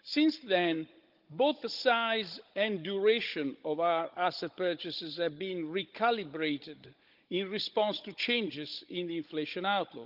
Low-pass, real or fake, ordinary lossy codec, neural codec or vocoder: 5.4 kHz; fake; Opus, 32 kbps; codec, 16 kHz, 16 kbps, FunCodec, trained on Chinese and English, 50 frames a second